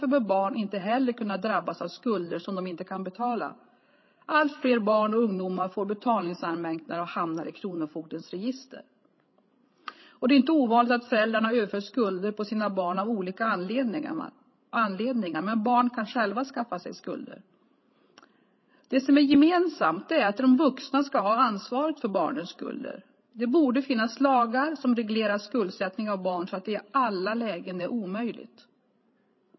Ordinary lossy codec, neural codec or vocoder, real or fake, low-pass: MP3, 24 kbps; codec, 16 kHz, 16 kbps, FreqCodec, larger model; fake; 7.2 kHz